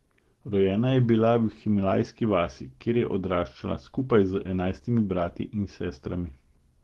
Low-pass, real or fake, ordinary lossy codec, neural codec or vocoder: 19.8 kHz; fake; Opus, 16 kbps; codec, 44.1 kHz, 7.8 kbps, DAC